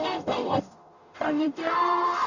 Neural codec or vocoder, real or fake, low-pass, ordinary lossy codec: codec, 44.1 kHz, 0.9 kbps, DAC; fake; 7.2 kHz; none